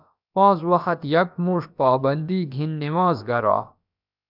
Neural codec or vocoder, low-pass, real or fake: codec, 16 kHz, about 1 kbps, DyCAST, with the encoder's durations; 5.4 kHz; fake